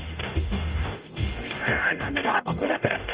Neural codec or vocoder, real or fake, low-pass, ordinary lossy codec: codec, 44.1 kHz, 0.9 kbps, DAC; fake; 3.6 kHz; Opus, 24 kbps